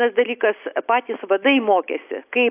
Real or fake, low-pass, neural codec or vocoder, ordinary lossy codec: real; 3.6 kHz; none; AAC, 32 kbps